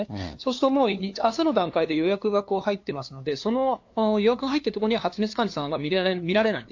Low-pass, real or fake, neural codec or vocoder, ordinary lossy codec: 7.2 kHz; fake; codec, 16 kHz, 2 kbps, FunCodec, trained on LibriTTS, 25 frames a second; AAC, 48 kbps